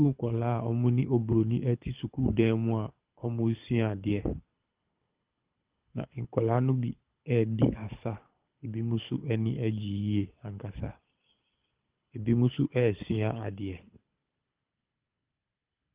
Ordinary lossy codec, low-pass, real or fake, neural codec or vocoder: Opus, 24 kbps; 3.6 kHz; fake; codec, 24 kHz, 6 kbps, HILCodec